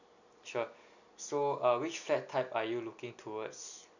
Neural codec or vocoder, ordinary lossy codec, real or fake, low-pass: none; none; real; 7.2 kHz